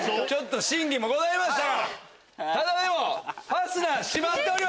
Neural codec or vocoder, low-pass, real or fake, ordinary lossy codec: none; none; real; none